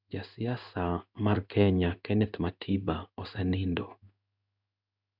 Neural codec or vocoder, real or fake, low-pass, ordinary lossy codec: codec, 16 kHz in and 24 kHz out, 1 kbps, XY-Tokenizer; fake; 5.4 kHz; none